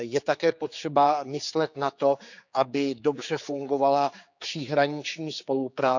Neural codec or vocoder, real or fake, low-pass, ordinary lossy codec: codec, 16 kHz, 4 kbps, X-Codec, HuBERT features, trained on general audio; fake; 7.2 kHz; none